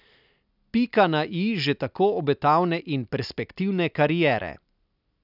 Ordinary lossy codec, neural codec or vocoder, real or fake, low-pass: none; none; real; 5.4 kHz